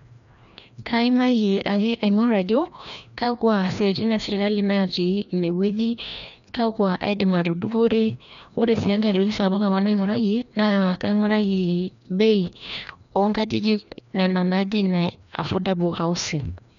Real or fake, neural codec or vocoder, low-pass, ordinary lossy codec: fake; codec, 16 kHz, 1 kbps, FreqCodec, larger model; 7.2 kHz; none